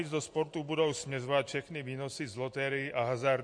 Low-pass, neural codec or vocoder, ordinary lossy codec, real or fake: 9.9 kHz; none; MP3, 48 kbps; real